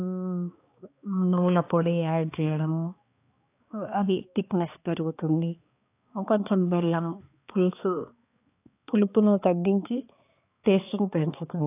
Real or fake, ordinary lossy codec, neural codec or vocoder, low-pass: fake; AAC, 24 kbps; codec, 16 kHz, 2 kbps, X-Codec, HuBERT features, trained on balanced general audio; 3.6 kHz